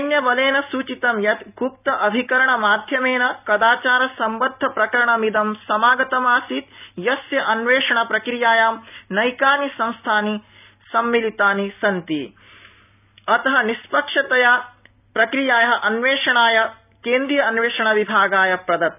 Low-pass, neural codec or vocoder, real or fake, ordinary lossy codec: 3.6 kHz; none; real; none